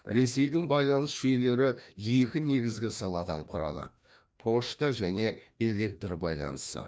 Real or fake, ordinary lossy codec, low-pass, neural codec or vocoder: fake; none; none; codec, 16 kHz, 1 kbps, FreqCodec, larger model